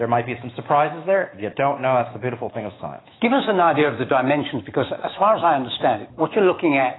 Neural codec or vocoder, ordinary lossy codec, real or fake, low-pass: autoencoder, 48 kHz, 128 numbers a frame, DAC-VAE, trained on Japanese speech; AAC, 16 kbps; fake; 7.2 kHz